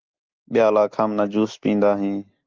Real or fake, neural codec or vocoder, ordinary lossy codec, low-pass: real; none; Opus, 32 kbps; 7.2 kHz